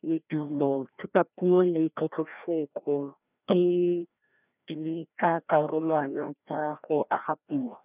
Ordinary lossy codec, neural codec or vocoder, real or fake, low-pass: none; codec, 16 kHz, 1 kbps, FreqCodec, larger model; fake; 3.6 kHz